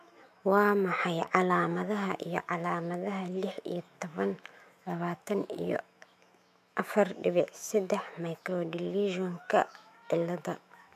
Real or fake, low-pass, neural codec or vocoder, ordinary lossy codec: fake; 14.4 kHz; autoencoder, 48 kHz, 128 numbers a frame, DAC-VAE, trained on Japanese speech; MP3, 96 kbps